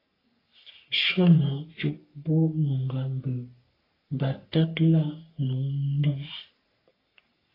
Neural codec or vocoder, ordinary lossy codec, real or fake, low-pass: codec, 44.1 kHz, 3.4 kbps, Pupu-Codec; AAC, 24 kbps; fake; 5.4 kHz